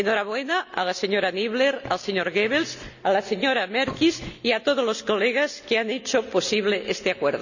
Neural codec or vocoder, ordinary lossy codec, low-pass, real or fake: none; none; 7.2 kHz; real